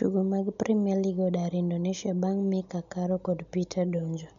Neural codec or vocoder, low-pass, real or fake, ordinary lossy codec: none; 7.2 kHz; real; none